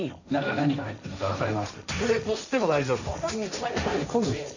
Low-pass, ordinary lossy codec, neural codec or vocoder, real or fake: 7.2 kHz; none; codec, 16 kHz, 1.1 kbps, Voila-Tokenizer; fake